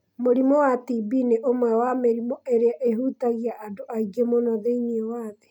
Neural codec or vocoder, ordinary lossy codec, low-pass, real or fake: none; none; 19.8 kHz; real